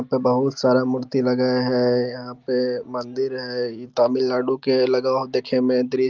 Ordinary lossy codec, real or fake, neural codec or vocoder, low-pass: Opus, 32 kbps; real; none; 7.2 kHz